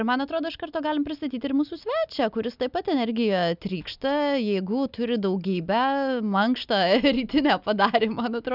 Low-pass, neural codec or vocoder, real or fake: 5.4 kHz; none; real